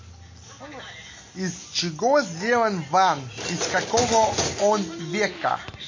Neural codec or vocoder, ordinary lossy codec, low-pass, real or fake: none; MP3, 32 kbps; 7.2 kHz; real